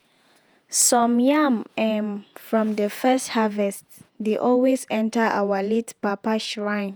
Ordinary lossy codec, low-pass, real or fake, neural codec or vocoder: none; none; fake; vocoder, 48 kHz, 128 mel bands, Vocos